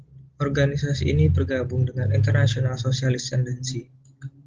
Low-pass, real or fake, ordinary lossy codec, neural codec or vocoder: 7.2 kHz; real; Opus, 16 kbps; none